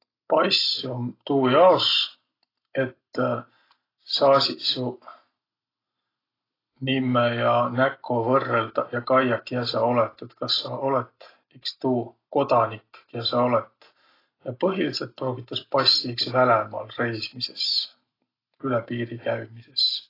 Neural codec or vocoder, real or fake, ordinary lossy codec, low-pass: none; real; AAC, 24 kbps; 5.4 kHz